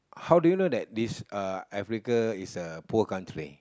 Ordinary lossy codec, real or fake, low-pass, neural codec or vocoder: none; real; none; none